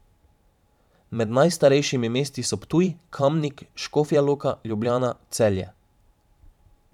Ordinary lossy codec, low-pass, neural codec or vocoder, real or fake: none; 19.8 kHz; vocoder, 44.1 kHz, 128 mel bands every 512 samples, BigVGAN v2; fake